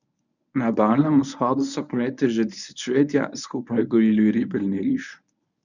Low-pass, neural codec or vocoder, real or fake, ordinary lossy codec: 7.2 kHz; codec, 24 kHz, 0.9 kbps, WavTokenizer, medium speech release version 1; fake; none